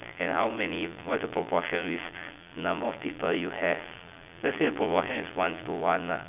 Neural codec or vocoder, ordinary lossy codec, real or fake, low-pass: vocoder, 22.05 kHz, 80 mel bands, Vocos; none; fake; 3.6 kHz